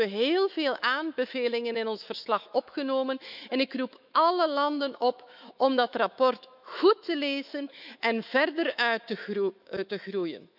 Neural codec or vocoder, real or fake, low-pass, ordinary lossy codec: autoencoder, 48 kHz, 128 numbers a frame, DAC-VAE, trained on Japanese speech; fake; 5.4 kHz; none